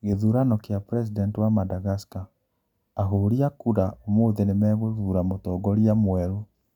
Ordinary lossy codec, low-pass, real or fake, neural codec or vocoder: none; 19.8 kHz; real; none